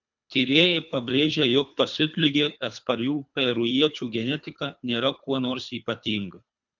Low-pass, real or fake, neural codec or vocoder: 7.2 kHz; fake; codec, 24 kHz, 3 kbps, HILCodec